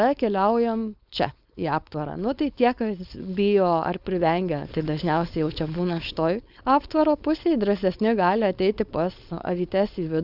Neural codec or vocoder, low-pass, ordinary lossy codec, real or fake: codec, 16 kHz, 4.8 kbps, FACodec; 5.4 kHz; Opus, 64 kbps; fake